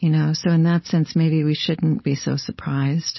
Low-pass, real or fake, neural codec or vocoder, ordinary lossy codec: 7.2 kHz; fake; codec, 16 kHz, 4.8 kbps, FACodec; MP3, 24 kbps